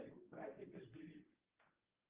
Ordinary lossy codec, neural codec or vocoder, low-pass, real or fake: Opus, 32 kbps; codec, 24 kHz, 0.9 kbps, WavTokenizer, medium speech release version 1; 3.6 kHz; fake